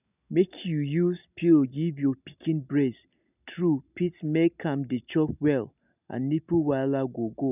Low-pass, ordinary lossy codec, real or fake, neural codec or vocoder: 3.6 kHz; none; real; none